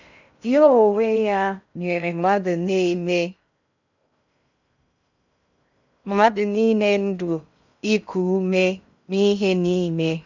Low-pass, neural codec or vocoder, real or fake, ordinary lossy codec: 7.2 kHz; codec, 16 kHz in and 24 kHz out, 0.6 kbps, FocalCodec, streaming, 2048 codes; fake; none